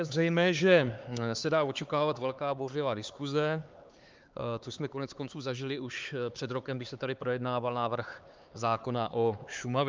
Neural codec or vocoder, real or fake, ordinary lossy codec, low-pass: codec, 16 kHz, 4 kbps, X-Codec, HuBERT features, trained on LibriSpeech; fake; Opus, 24 kbps; 7.2 kHz